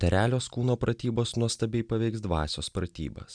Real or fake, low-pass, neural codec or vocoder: real; 9.9 kHz; none